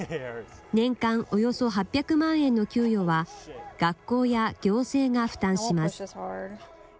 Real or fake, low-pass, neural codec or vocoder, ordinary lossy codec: real; none; none; none